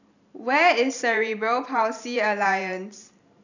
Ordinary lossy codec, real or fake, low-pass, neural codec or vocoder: none; fake; 7.2 kHz; vocoder, 44.1 kHz, 128 mel bands every 512 samples, BigVGAN v2